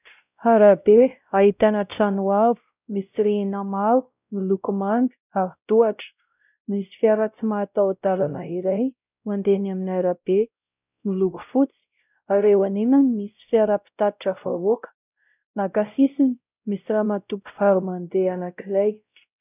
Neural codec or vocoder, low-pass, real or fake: codec, 16 kHz, 0.5 kbps, X-Codec, WavLM features, trained on Multilingual LibriSpeech; 3.6 kHz; fake